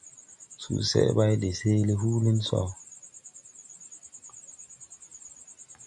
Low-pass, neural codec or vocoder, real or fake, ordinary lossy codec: 10.8 kHz; none; real; AAC, 64 kbps